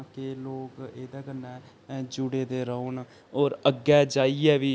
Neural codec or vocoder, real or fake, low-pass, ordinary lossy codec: none; real; none; none